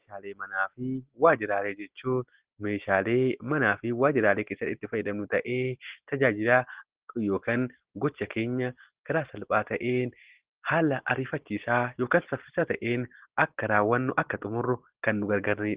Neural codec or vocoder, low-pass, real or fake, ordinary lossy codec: none; 3.6 kHz; real; Opus, 16 kbps